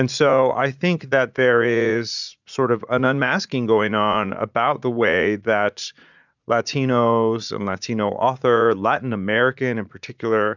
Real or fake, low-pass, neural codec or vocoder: fake; 7.2 kHz; vocoder, 44.1 kHz, 80 mel bands, Vocos